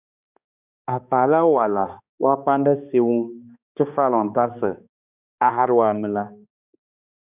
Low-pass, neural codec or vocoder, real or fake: 3.6 kHz; codec, 16 kHz, 2 kbps, X-Codec, HuBERT features, trained on balanced general audio; fake